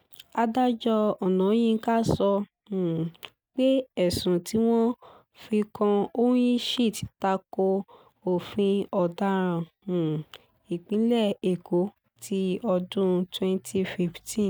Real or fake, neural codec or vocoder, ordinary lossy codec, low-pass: real; none; none; none